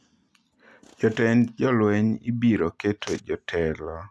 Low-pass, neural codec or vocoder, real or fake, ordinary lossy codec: none; none; real; none